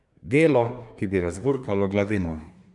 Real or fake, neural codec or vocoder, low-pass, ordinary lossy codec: fake; codec, 24 kHz, 1 kbps, SNAC; 10.8 kHz; none